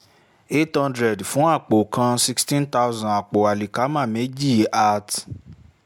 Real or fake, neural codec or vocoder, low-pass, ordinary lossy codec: real; none; 19.8 kHz; MP3, 96 kbps